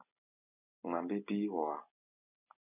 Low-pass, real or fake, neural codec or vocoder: 3.6 kHz; real; none